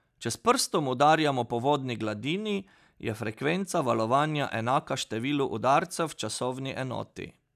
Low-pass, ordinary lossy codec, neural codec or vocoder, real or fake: 14.4 kHz; none; none; real